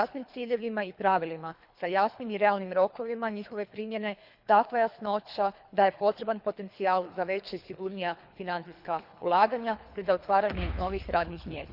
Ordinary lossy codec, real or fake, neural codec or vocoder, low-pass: Opus, 64 kbps; fake; codec, 24 kHz, 3 kbps, HILCodec; 5.4 kHz